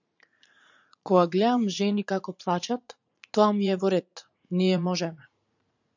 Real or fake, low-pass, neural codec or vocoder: fake; 7.2 kHz; vocoder, 44.1 kHz, 80 mel bands, Vocos